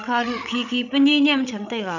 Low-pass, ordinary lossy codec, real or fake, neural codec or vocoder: 7.2 kHz; none; fake; codec, 16 kHz, 16 kbps, FreqCodec, larger model